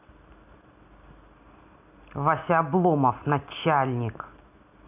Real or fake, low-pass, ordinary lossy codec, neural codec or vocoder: real; 3.6 kHz; AAC, 32 kbps; none